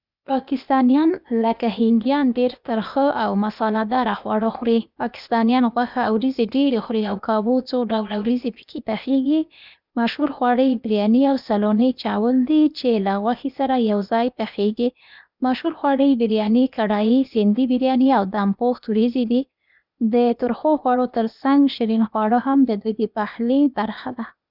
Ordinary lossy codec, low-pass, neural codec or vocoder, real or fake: none; 5.4 kHz; codec, 16 kHz, 0.8 kbps, ZipCodec; fake